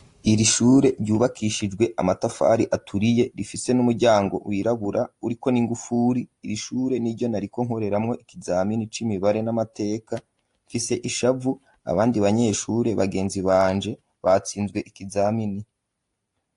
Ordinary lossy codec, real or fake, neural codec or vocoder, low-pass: AAC, 48 kbps; real; none; 10.8 kHz